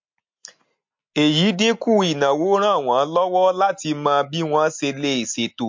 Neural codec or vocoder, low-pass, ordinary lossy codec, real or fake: none; 7.2 kHz; MP3, 48 kbps; real